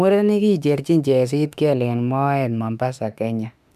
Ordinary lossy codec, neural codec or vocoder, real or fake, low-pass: none; autoencoder, 48 kHz, 32 numbers a frame, DAC-VAE, trained on Japanese speech; fake; 14.4 kHz